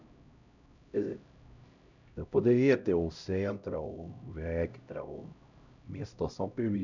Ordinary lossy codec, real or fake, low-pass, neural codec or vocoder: none; fake; 7.2 kHz; codec, 16 kHz, 0.5 kbps, X-Codec, HuBERT features, trained on LibriSpeech